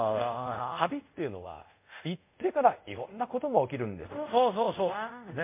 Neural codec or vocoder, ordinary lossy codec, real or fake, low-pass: codec, 24 kHz, 0.5 kbps, DualCodec; MP3, 32 kbps; fake; 3.6 kHz